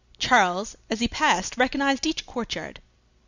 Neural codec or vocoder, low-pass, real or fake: none; 7.2 kHz; real